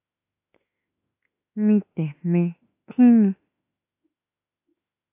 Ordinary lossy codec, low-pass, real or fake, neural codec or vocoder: AAC, 24 kbps; 3.6 kHz; fake; autoencoder, 48 kHz, 32 numbers a frame, DAC-VAE, trained on Japanese speech